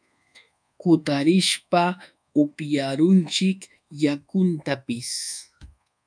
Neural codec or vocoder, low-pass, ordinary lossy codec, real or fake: codec, 24 kHz, 1.2 kbps, DualCodec; 9.9 kHz; MP3, 96 kbps; fake